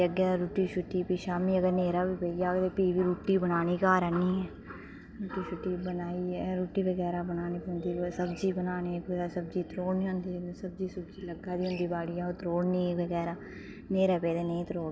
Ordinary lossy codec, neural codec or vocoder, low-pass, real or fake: none; none; none; real